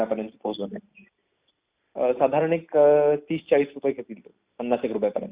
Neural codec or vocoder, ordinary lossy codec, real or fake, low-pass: none; Opus, 64 kbps; real; 3.6 kHz